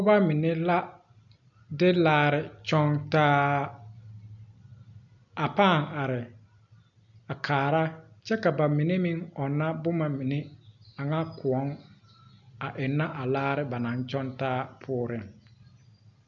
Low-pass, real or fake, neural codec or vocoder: 7.2 kHz; real; none